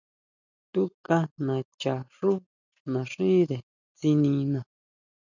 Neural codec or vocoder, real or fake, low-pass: none; real; 7.2 kHz